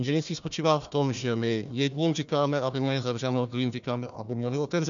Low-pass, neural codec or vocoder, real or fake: 7.2 kHz; codec, 16 kHz, 1 kbps, FunCodec, trained on Chinese and English, 50 frames a second; fake